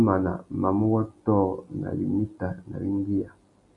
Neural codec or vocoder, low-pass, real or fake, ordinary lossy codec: none; 10.8 kHz; real; MP3, 96 kbps